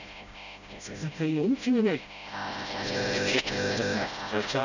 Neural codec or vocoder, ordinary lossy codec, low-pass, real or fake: codec, 16 kHz, 0.5 kbps, FreqCodec, smaller model; none; 7.2 kHz; fake